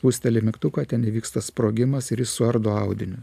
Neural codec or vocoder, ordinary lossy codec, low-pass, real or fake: none; AAC, 96 kbps; 14.4 kHz; real